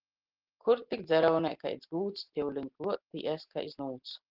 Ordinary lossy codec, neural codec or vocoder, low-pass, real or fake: Opus, 16 kbps; none; 5.4 kHz; real